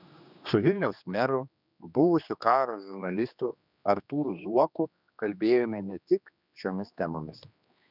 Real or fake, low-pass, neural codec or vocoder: fake; 5.4 kHz; codec, 16 kHz, 2 kbps, X-Codec, HuBERT features, trained on general audio